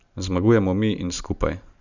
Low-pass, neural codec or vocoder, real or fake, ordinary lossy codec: 7.2 kHz; none; real; none